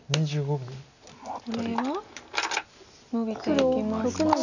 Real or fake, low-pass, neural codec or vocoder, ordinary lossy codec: real; 7.2 kHz; none; none